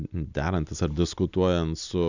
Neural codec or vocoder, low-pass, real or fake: none; 7.2 kHz; real